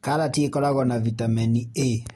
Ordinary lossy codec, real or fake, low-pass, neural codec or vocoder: AAC, 32 kbps; real; 19.8 kHz; none